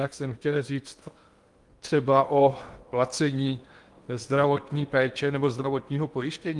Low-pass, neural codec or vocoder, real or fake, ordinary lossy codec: 10.8 kHz; codec, 16 kHz in and 24 kHz out, 0.8 kbps, FocalCodec, streaming, 65536 codes; fake; Opus, 32 kbps